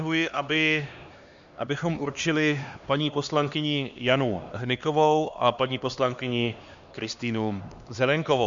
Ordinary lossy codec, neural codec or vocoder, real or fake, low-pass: Opus, 64 kbps; codec, 16 kHz, 2 kbps, X-Codec, HuBERT features, trained on LibriSpeech; fake; 7.2 kHz